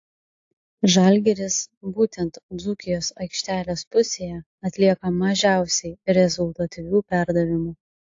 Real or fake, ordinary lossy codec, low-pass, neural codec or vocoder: real; AAC, 48 kbps; 7.2 kHz; none